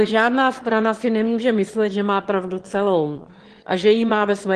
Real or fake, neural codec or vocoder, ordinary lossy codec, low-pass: fake; autoencoder, 22.05 kHz, a latent of 192 numbers a frame, VITS, trained on one speaker; Opus, 16 kbps; 9.9 kHz